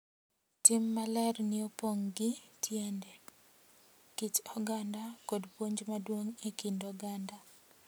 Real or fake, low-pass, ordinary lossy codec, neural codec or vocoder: real; none; none; none